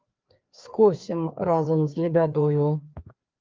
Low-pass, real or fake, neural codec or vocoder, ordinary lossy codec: 7.2 kHz; fake; codec, 16 kHz, 2 kbps, FreqCodec, larger model; Opus, 32 kbps